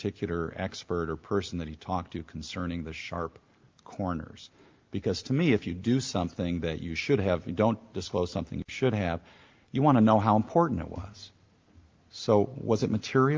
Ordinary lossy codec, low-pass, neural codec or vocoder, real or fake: Opus, 32 kbps; 7.2 kHz; none; real